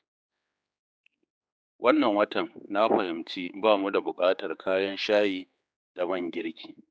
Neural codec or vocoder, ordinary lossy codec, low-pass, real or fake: codec, 16 kHz, 4 kbps, X-Codec, HuBERT features, trained on general audio; none; none; fake